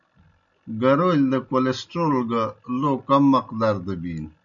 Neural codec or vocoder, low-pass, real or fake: none; 7.2 kHz; real